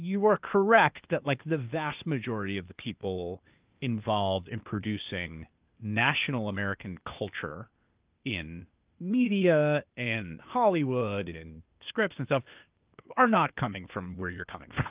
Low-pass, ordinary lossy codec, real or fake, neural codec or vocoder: 3.6 kHz; Opus, 24 kbps; fake; codec, 16 kHz, 0.8 kbps, ZipCodec